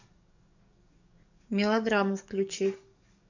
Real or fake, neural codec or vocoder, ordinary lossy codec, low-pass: fake; codec, 44.1 kHz, 7.8 kbps, DAC; none; 7.2 kHz